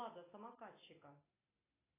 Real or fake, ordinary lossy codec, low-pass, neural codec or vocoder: real; AAC, 24 kbps; 3.6 kHz; none